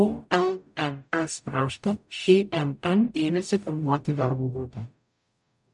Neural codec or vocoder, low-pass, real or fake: codec, 44.1 kHz, 0.9 kbps, DAC; 10.8 kHz; fake